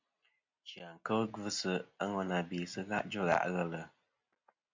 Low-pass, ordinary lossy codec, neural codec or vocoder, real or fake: 7.2 kHz; MP3, 64 kbps; none; real